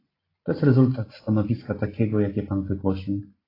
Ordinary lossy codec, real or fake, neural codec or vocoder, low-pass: AAC, 24 kbps; real; none; 5.4 kHz